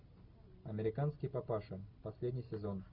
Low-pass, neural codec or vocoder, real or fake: 5.4 kHz; none; real